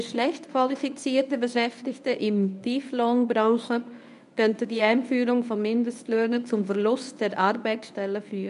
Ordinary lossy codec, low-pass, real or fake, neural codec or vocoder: none; 10.8 kHz; fake; codec, 24 kHz, 0.9 kbps, WavTokenizer, medium speech release version 1